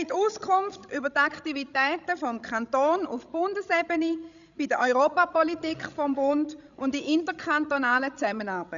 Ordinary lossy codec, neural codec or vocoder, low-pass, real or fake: none; codec, 16 kHz, 16 kbps, FreqCodec, larger model; 7.2 kHz; fake